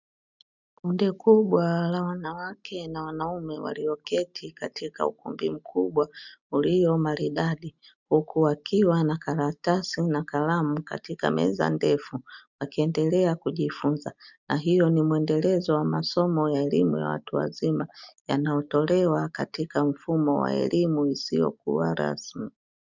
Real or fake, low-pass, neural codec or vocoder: real; 7.2 kHz; none